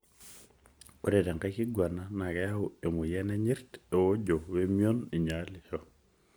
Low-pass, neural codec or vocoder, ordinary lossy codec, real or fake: none; none; none; real